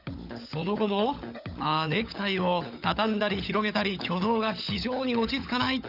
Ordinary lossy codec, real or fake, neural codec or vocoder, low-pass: none; fake; codec, 16 kHz, 16 kbps, FunCodec, trained on LibriTTS, 50 frames a second; 5.4 kHz